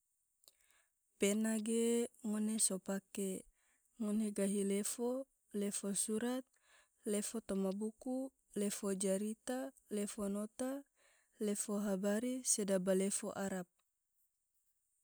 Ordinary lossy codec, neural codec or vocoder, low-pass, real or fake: none; none; none; real